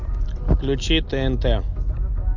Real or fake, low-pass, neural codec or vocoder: real; 7.2 kHz; none